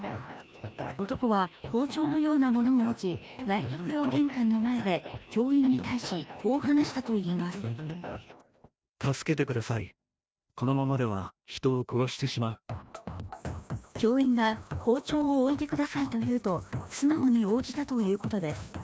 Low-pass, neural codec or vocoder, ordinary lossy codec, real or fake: none; codec, 16 kHz, 1 kbps, FreqCodec, larger model; none; fake